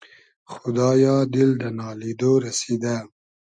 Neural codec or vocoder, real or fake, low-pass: none; real; 9.9 kHz